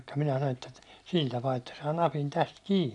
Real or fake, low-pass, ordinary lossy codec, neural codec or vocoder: real; 10.8 kHz; none; none